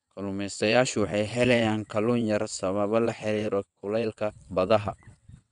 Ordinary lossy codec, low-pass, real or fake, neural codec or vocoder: none; 9.9 kHz; fake; vocoder, 22.05 kHz, 80 mel bands, WaveNeXt